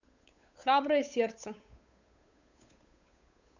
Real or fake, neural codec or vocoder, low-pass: fake; codec, 16 kHz, 8 kbps, FunCodec, trained on Chinese and English, 25 frames a second; 7.2 kHz